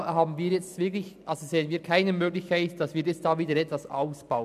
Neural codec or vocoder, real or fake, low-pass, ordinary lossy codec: none; real; 14.4 kHz; none